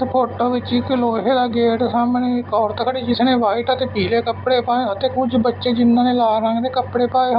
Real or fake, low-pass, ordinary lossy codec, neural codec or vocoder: real; 5.4 kHz; Opus, 64 kbps; none